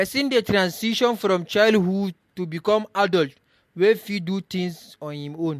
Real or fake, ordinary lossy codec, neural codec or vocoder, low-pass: real; MP3, 64 kbps; none; 14.4 kHz